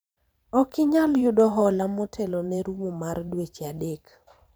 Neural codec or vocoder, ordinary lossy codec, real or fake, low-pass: vocoder, 44.1 kHz, 128 mel bands every 512 samples, BigVGAN v2; none; fake; none